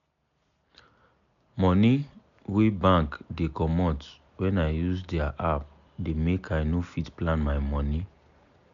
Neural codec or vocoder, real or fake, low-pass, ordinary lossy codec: none; real; 7.2 kHz; MP3, 96 kbps